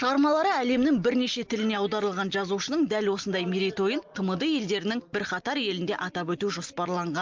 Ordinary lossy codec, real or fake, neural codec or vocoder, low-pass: Opus, 32 kbps; real; none; 7.2 kHz